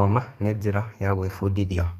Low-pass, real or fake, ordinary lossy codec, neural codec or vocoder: 14.4 kHz; fake; Opus, 64 kbps; codec, 32 kHz, 1.9 kbps, SNAC